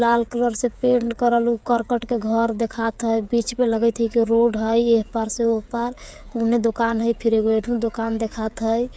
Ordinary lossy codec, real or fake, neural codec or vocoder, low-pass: none; fake; codec, 16 kHz, 8 kbps, FreqCodec, smaller model; none